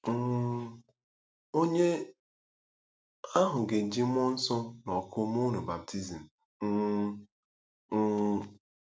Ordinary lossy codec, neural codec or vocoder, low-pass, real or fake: none; none; none; real